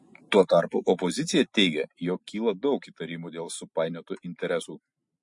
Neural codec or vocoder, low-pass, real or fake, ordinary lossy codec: none; 10.8 kHz; real; MP3, 48 kbps